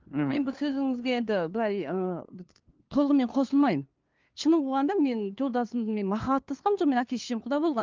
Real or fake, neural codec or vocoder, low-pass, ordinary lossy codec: fake; codec, 16 kHz, 2 kbps, FunCodec, trained on LibriTTS, 25 frames a second; 7.2 kHz; Opus, 32 kbps